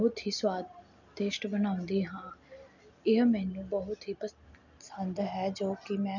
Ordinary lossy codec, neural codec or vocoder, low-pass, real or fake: none; none; 7.2 kHz; real